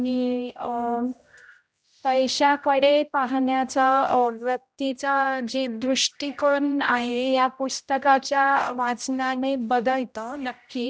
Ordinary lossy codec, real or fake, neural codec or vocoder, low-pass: none; fake; codec, 16 kHz, 0.5 kbps, X-Codec, HuBERT features, trained on general audio; none